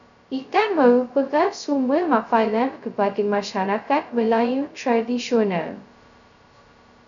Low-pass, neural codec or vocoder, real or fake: 7.2 kHz; codec, 16 kHz, 0.2 kbps, FocalCodec; fake